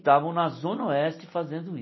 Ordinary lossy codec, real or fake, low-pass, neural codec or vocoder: MP3, 24 kbps; real; 7.2 kHz; none